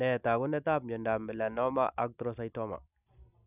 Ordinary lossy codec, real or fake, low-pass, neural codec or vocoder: none; real; 3.6 kHz; none